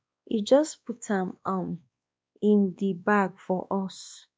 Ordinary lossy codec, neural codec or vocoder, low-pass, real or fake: none; codec, 16 kHz, 2 kbps, X-Codec, WavLM features, trained on Multilingual LibriSpeech; none; fake